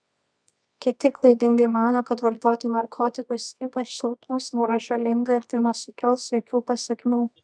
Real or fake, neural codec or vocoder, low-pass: fake; codec, 24 kHz, 0.9 kbps, WavTokenizer, medium music audio release; 9.9 kHz